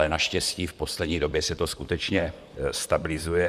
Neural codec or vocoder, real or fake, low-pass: vocoder, 44.1 kHz, 128 mel bands, Pupu-Vocoder; fake; 14.4 kHz